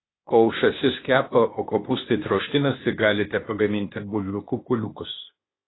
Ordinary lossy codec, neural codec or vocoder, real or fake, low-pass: AAC, 16 kbps; codec, 16 kHz, 0.8 kbps, ZipCodec; fake; 7.2 kHz